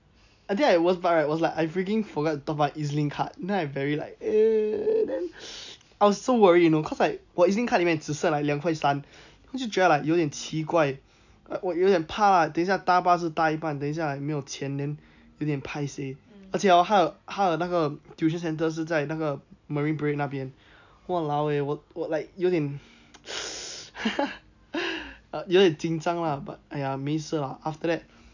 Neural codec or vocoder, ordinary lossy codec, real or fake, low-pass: none; none; real; 7.2 kHz